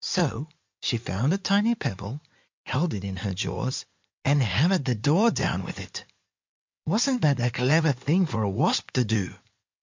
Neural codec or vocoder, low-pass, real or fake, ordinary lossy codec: codec, 16 kHz in and 24 kHz out, 2.2 kbps, FireRedTTS-2 codec; 7.2 kHz; fake; MP3, 64 kbps